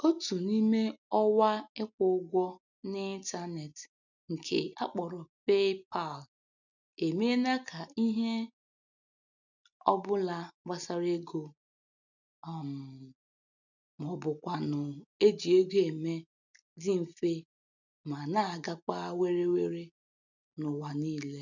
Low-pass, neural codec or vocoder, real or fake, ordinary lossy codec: 7.2 kHz; none; real; none